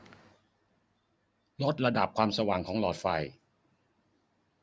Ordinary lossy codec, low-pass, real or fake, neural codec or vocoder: none; none; real; none